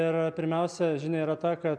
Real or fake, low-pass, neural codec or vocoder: real; 9.9 kHz; none